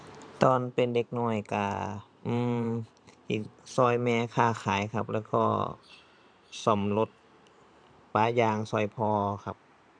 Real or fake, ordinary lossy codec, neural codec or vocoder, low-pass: fake; none; vocoder, 44.1 kHz, 128 mel bands every 512 samples, BigVGAN v2; 9.9 kHz